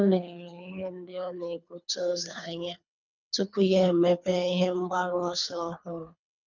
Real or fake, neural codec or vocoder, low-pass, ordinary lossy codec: fake; codec, 24 kHz, 3 kbps, HILCodec; 7.2 kHz; none